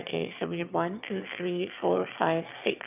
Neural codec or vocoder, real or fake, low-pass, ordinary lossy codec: autoencoder, 22.05 kHz, a latent of 192 numbers a frame, VITS, trained on one speaker; fake; 3.6 kHz; none